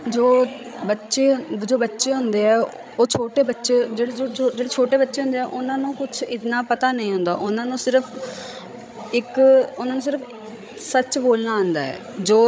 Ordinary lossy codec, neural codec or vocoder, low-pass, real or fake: none; codec, 16 kHz, 16 kbps, FreqCodec, larger model; none; fake